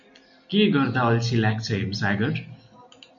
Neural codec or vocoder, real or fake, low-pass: none; real; 7.2 kHz